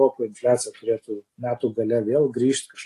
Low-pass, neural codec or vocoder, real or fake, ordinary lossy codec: 14.4 kHz; none; real; AAC, 48 kbps